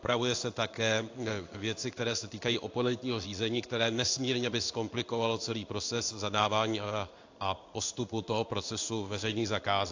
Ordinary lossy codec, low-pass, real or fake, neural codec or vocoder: AAC, 48 kbps; 7.2 kHz; fake; codec, 16 kHz in and 24 kHz out, 1 kbps, XY-Tokenizer